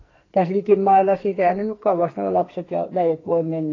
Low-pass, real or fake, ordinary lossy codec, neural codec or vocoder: 7.2 kHz; fake; AAC, 32 kbps; codec, 44.1 kHz, 2.6 kbps, SNAC